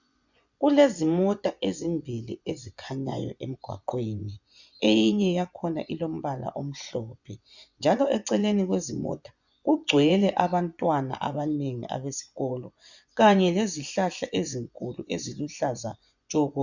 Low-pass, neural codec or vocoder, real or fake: 7.2 kHz; vocoder, 24 kHz, 100 mel bands, Vocos; fake